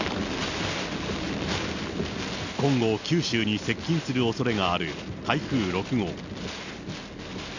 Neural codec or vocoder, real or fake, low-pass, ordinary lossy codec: none; real; 7.2 kHz; none